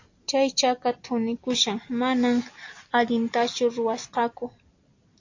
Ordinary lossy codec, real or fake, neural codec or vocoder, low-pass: AAC, 48 kbps; real; none; 7.2 kHz